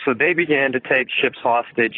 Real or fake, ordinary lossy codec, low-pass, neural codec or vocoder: fake; AAC, 24 kbps; 5.4 kHz; vocoder, 44.1 kHz, 128 mel bands, Pupu-Vocoder